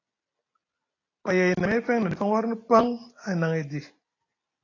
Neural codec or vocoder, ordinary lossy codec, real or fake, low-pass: none; AAC, 32 kbps; real; 7.2 kHz